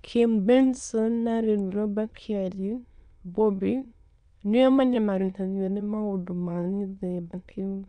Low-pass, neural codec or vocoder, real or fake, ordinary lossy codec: 9.9 kHz; autoencoder, 22.05 kHz, a latent of 192 numbers a frame, VITS, trained on many speakers; fake; MP3, 96 kbps